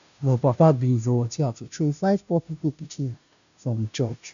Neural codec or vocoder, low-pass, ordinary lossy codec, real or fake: codec, 16 kHz, 0.5 kbps, FunCodec, trained on Chinese and English, 25 frames a second; 7.2 kHz; none; fake